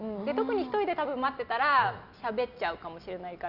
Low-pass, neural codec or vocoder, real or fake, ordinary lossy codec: 5.4 kHz; none; real; none